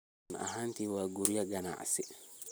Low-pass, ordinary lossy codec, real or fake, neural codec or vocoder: none; none; real; none